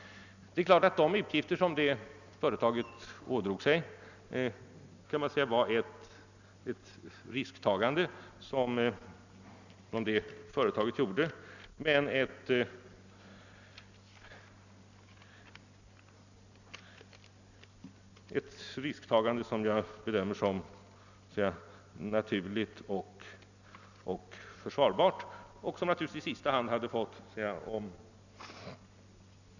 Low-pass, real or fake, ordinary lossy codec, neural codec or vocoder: 7.2 kHz; real; none; none